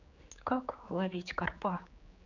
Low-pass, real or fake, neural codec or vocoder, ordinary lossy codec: 7.2 kHz; fake; codec, 16 kHz, 4 kbps, X-Codec, HuBERT features, trained on general audio; none